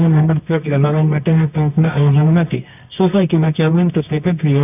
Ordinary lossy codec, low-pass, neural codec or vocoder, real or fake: none; 3.6 kHz; codec, 24 kHz, 0.9 kbps, WavTokenizer, medium music audio release; fake